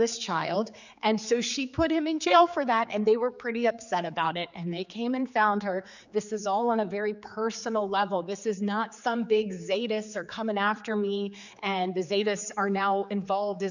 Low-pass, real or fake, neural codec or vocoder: 7.2 kHz; fake; codec, 16 kHz, 4 kbps, X-Codec, HuBERT features, trained on general audio